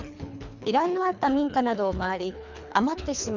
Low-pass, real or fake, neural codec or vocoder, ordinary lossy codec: 7.2 kHz; fake; codec, 24 kHz, 3 kbps, HILCodec; none